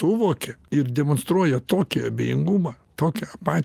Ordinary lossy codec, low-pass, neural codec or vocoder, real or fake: Opus, 24 kbps; 14.4 kHz; none; real